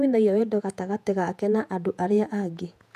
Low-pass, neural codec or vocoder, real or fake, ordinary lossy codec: 14.4 kHz; vocoder, 48 kHz, 128 mel bands, Vocos; fake; none